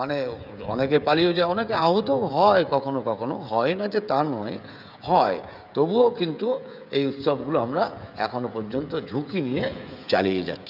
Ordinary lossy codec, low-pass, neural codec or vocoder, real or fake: none; 5.4 kHz; codec, 16 kHz, 16 kbps, FreqCodec, smaller model; fake